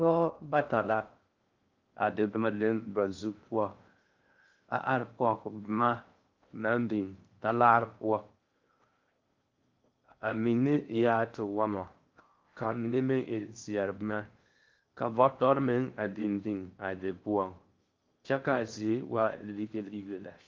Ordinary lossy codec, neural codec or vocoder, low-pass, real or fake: Opus, 24 kbps; codec, 16 kHz in and 24 kHz out, 0.6 kbps, FocalCodec, streaming, 2048 codes; 7.2 kHz; fake